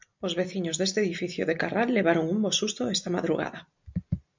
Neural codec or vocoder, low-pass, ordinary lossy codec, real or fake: none; 7.2 kHz; MP3, 64 kbps; real